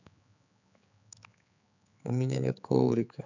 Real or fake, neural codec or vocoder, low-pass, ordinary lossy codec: fake; codec, 16 kHz, 4 kbps, X-Codec, HuBERT features, trained on general audio; 7.2 kHz; none